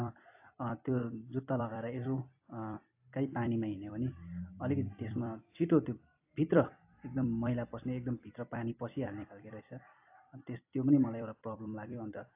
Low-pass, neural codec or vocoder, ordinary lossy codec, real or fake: 3.6 kHz; vocoder, 44.1 kHz, 128 mel bands every 256 samples, BigVGAN v2; AAC, 32 kbps; fake